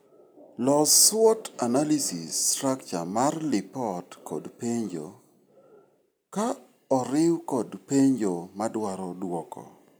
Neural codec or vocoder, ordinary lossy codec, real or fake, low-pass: none; none; real; none